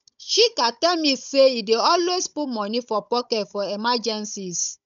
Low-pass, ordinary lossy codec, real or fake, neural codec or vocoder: 7.2 kHz; none; fake; codec, 16 kHz, 16 kbps, FunCodec, trained on Chinese and English, 50 frames a second